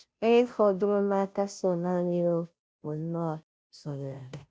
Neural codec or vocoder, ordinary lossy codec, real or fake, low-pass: codec, 16 kHz, 0.5 kbps, FunCodec, trained on Chinese and English, 25 frames a second; none; fake; none